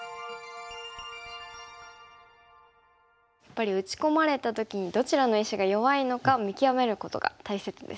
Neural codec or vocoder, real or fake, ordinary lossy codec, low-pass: none; real; none; none